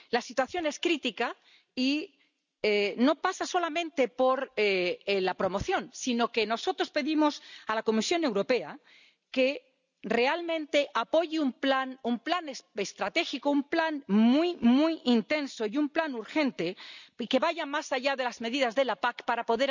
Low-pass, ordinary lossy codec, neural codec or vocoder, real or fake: 7.2 kHz; none; none; real